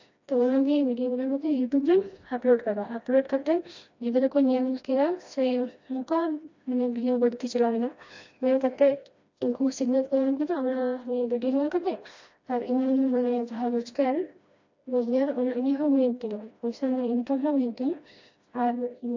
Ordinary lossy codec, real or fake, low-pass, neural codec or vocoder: AAC, 48 kbps; fake; 7.2 kHz; codec, 16 kHz, 1 kbps, FreqCodec, smaller model